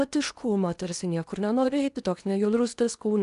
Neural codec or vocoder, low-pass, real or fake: codec, 16 kHz in and 24 kHz out, 0.8 kbps, FocalCodec, streaming, 65536 codes; 10.8 kHz; fake